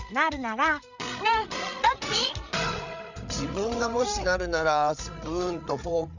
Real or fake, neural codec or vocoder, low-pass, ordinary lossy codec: fake; codec, 16 kHz, 8 kbps, FreqCodec, larger model; 7.2 kHz; none